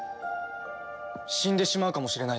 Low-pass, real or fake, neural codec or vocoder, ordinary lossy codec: none; real; none; none